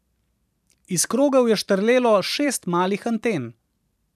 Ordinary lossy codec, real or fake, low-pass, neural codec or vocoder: none; fake; 14.4 kHz; vocoder, 44.1 kHz, 128 mel bands every 512 samples, BigVGAN v2